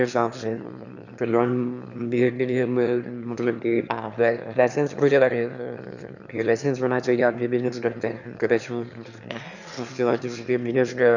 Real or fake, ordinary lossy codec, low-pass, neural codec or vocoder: fake; none; 7.2 kHz; autoencoder, 22.05 kHz, a latent of 192 numbers a frame, VITS, trained on one speaker